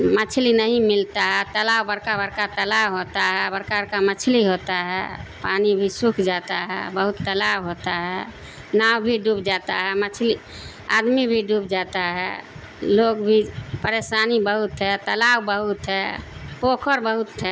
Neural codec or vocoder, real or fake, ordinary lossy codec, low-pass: none; real; none; none